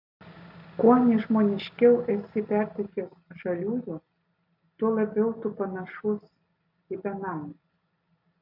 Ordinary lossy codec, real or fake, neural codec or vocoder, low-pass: AAC, 48 kbps; real; none; 5.4 kHz